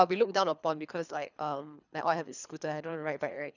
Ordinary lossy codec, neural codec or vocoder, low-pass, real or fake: none; codec, 24 kHz, 3 kbps, HILCodec; 7.2 kHz; fake